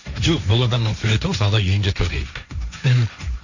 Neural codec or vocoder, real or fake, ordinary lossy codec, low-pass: codec, 16 kHz, 1.1 kbps, Voila-Tokenizer; fake; none; 7.2 kHz